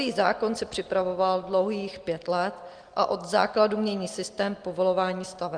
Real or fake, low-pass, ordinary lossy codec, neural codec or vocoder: real; 9.9 kHz; Opus, 24 kbps; none